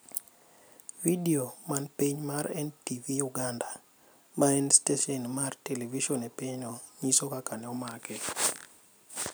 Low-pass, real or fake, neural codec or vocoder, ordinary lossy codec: none; real; none; none